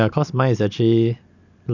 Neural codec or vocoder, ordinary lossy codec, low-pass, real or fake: none; none; 7.2 kHz; real